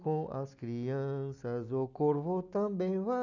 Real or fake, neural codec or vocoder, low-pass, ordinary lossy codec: real; none; 7.2 kHz; none